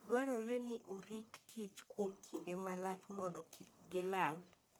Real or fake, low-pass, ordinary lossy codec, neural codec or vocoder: fake; none; none; codec, 44.1 kHz, 1.7 kbps, Pupu-Codec